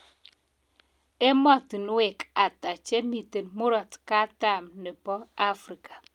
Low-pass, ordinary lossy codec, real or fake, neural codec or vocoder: 19.8 kHz; Opus, 32 kbps; real; none